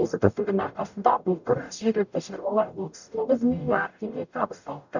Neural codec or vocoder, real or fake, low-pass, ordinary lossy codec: codec, 44.1 kHz, 0.9 kbps, DAC; fake; 7.2 kHz; none